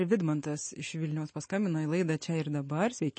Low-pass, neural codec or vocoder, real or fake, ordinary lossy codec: 9.9 kHz; none; real; MP3, 32 kbps